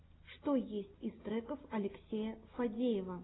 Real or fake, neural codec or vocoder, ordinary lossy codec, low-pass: real; none; AAC, 16 kbps; 7.2 kHz